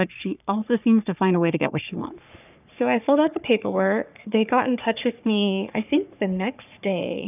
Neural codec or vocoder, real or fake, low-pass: codec, 44.1 kHz, 3.4 kbps, Pupu-Codec; fake; 3.6 kHz